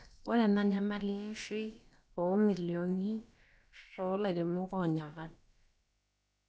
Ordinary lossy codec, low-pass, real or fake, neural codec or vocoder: none; none; fake; codec, 16 kHz, about 1 kbps, DyCAST, with the encoder's durations